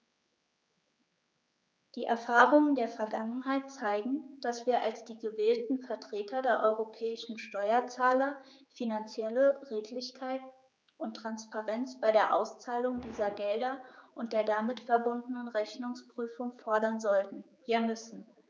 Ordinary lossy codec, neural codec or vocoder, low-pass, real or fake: none; codec, 16 kHz, 4 kbps, X-Codec, HuBERT features, trained on general audio; none; fake